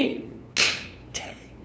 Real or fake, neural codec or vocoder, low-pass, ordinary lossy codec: fake; codec, 16 kHz, 2 kbps, FunCodec, trained on LibriTTS, 25 frames a second; none; none